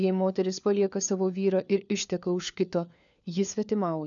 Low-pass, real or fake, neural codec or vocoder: 7.2 kHz; fake; codec, 16 kHz, 4 kbps, FunCodec, trained on LibriTTS, 50 frames a second